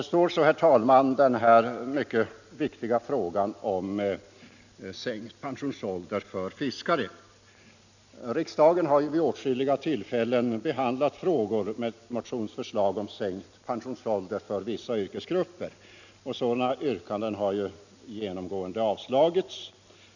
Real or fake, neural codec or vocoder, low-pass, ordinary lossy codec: real; none; 7.2 kHz; none